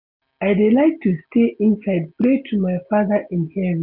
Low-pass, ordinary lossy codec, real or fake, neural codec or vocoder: 5.4 kHz; none; real; none